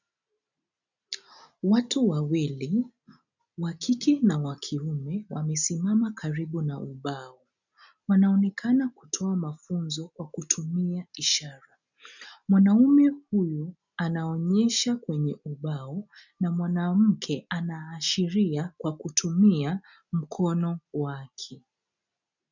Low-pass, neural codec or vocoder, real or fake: 7.2 kHz; none; real